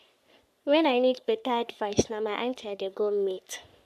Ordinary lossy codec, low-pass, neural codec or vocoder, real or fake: none; 14.4 kHz; codec, 44.1 kHz, 3.4 kbps, Pupu-Codec; fake